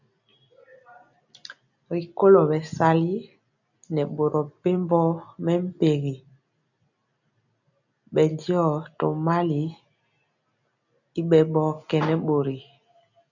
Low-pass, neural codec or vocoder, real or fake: 7.2 kHz; none; real